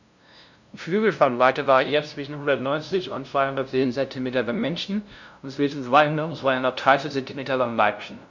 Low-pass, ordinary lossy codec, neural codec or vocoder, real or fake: 7.2 kHz; none; codec, 16 kHz, 0.5 kbps, FunCodec, trained on LibriTTS, 25 frames a second; fake